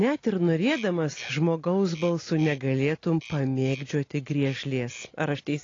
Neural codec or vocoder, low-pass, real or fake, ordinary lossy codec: none; 7.2 kHz; real; AAC, 32 kbps